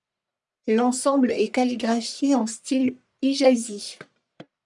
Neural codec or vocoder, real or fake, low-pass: codec, 44.1 kHz, 1.7 kbps, Pupu-Codec; fake; 10.8 kHz